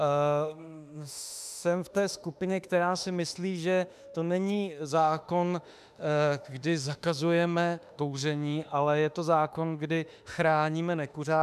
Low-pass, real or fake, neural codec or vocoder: 14.4 kHz; fake; autoencoder, 48 kHz, 32 numbers a frame, DAC-VAE, trained on Japanese speech